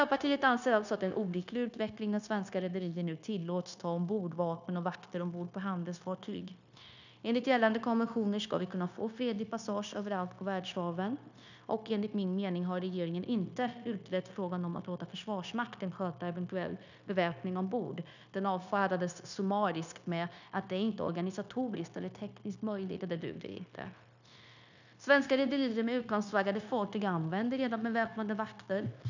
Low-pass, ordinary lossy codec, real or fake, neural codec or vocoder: 7.2 kHz; none; fake; codec, 16 kHz, 0.9 kbps, LongCat-Audio-Codec